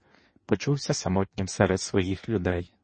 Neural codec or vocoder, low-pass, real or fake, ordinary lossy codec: codec, 16 kHz in and 24 kHz out, 1.1 kbps, FireRedTTS-2 codec; 9.9 kHz; fake; MP3, 32 kbps